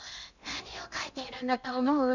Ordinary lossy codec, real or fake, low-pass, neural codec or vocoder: none; fake; 7.2 kHz; codec, 16 kHz in and 24 kHz out, 0.8 kbps, FocalCodec, streaming, 65536 codes